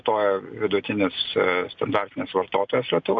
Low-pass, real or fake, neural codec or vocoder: 7.2 kHz; real; none